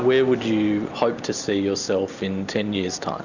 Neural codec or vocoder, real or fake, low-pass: none; real; 7.2 kHz